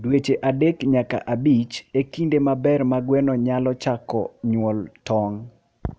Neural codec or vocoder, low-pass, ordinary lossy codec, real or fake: none; none; none; real